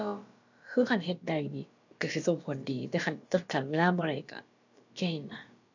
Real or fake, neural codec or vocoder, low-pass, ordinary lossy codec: fake; codec, 16 kHz, about 1 kbps, DyCAST, with the encoder's durations; 7.2 kHz; AAC, 48 kbps